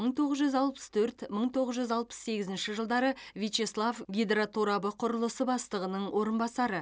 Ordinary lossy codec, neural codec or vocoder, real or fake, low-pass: none; none; real; none